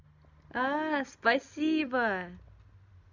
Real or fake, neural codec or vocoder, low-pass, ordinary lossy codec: real; none; 7.2 kHz; none